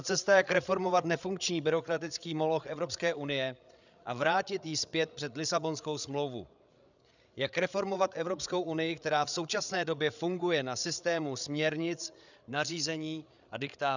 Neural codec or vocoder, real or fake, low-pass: codec, 16 kHz, 8 kbps, FreqCodec, larger model; fake; 7.2 kHz